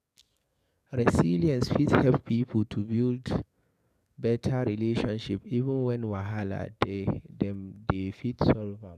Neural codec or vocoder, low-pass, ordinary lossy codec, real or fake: codec, 44.1 kHz, 7.8 kbps, DAC; 14.4 kHz; none; fake